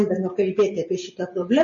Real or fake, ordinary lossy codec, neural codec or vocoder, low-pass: real; MP3, 32 kbps; none; 7.2 kHz